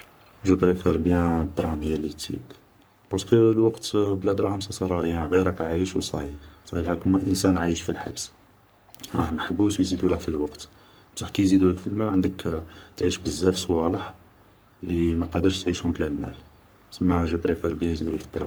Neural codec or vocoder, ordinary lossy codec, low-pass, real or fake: codec, 44.1 kHz, 3.4 kbps, Pupu-Codec; none; none; fake